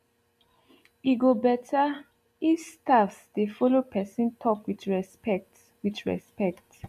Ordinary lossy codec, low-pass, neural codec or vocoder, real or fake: MP3, 64 kbps; 14.4 kHz; none; real